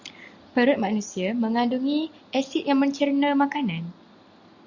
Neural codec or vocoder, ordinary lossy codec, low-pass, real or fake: none; Opus, 64 kbps; 7.2 kHz; real